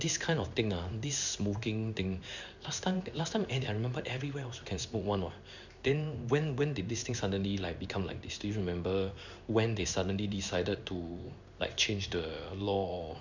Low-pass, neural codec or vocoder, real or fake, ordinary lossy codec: 7.2 kHz; codec, 16 kHz in and 24 kHz out, 1 kbps, XY-Tokenizer; fake; none